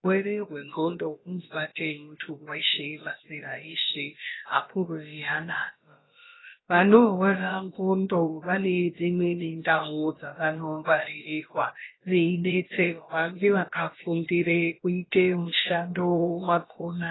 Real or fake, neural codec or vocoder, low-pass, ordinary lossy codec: fake; codec, 16 kHz, about 1 kbps, DyCAST, with the encoder's durations; 7.2 kHz; AAC, 16 kbps